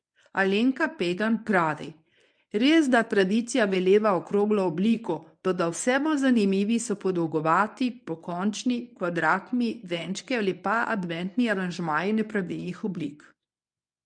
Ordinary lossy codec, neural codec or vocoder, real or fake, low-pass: none; codec, 24 kHz, 0.9 kbps, WavTokenizer, medium speech release version 1; fake; 9.9 kHz